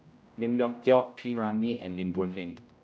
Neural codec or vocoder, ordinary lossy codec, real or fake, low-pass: codec, 16 kHz, 0.5 kbps, X-Codec, HuBERT features, trained on general audio; none; fake; none